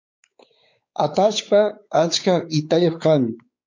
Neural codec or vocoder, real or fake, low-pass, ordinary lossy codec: codec, 16 kHz, 4 kbps, X-Codec, WavLM features, trained on Multilingual LibriSpeech; fake; 7.2 kHz; MP3, 64 kbps